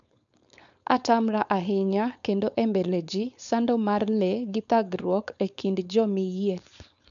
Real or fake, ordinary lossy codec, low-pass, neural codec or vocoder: fake; none; 7.2 kHz; codec, 16 kHz, 4.8 kbps, FACodec